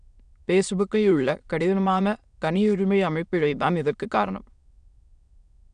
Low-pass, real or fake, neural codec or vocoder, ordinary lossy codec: 9.9 kHz; fake; autoencoder, 22.05 kHz, a latent of 192 numbers a frame, VITS, trained on many speakers; none